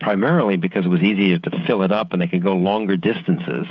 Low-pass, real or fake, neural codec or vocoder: 7.2 kHz; fake; codec, 16 kHz, 16 kbps, FreqCodec, smaller model